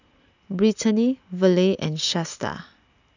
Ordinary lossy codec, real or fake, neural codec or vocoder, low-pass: none; real; none; 7.2 kHz